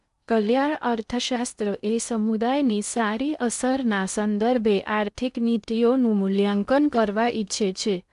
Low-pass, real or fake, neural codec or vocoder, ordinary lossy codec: 10.8 kHz; fake; codec, 16 kHz in and 24 kHz out, 0.6 kbps, FocalCodec, streaming, 2048 codes; AAC, 96 kbps